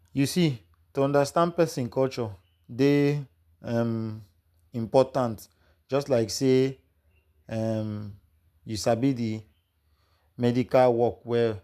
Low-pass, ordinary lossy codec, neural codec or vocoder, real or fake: 14.4 kHz; none; none; real